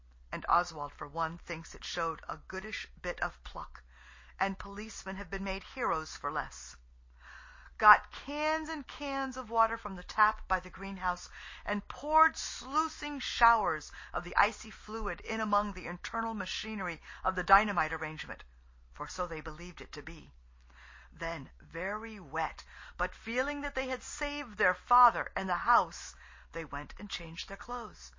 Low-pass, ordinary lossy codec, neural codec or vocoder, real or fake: 7.2 kHz; MP3, 32 kbps; none; real